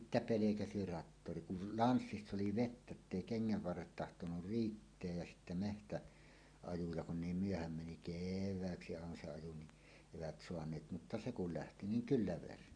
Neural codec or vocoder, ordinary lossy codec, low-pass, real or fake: none; Opus, 64 kbps; 9.9 kHz; real